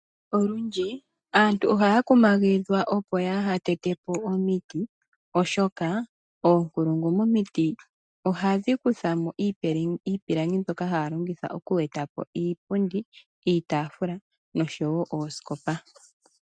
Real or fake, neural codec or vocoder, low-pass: real; none; 9.9 kHz